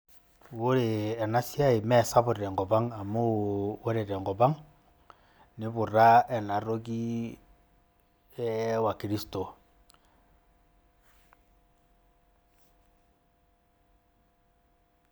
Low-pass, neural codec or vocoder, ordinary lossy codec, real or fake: none; none; none; real